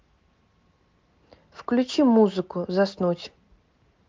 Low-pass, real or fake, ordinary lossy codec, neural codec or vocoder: 7.2 kHz; real; Opus, 32 kbps; none